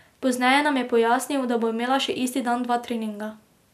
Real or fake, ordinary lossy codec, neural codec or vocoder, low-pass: real; none; none; 14.4 kHz